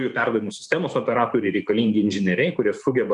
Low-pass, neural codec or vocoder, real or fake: 10.8 kHz; vocoder, 44.1 kHz, 128 mel bands every 512 samples, BigVGAN v2; fake